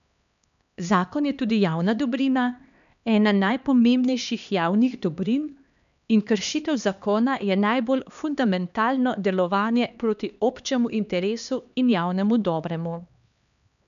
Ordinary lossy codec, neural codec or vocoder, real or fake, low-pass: none; codec, 16 kHz, 2 kbps, X-Codec, HuBERT features, trained on LibriSpeech; fake; 7.2 kHz